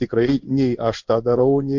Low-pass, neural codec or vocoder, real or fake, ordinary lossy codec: 7.2 kHz; codec, 16 kHz in and 24 kHz out, 1 kbps, XY-Tokenizer; fake; MP3, 64 kbps